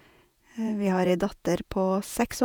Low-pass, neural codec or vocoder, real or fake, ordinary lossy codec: none; vocoder, 48 kHz, 128 mel bands, Vocos; fake; none